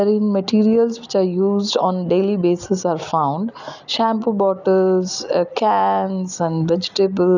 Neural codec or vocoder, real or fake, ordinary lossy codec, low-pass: none; real; none; 7.2 kHz